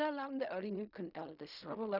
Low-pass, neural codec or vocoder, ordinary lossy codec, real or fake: 5.4 kHz; codec, 16 kHz in and 24 kHz out, 0.4 kbps, LongCat-Audio-Codec, fine tuned four codebook decoder; none; fake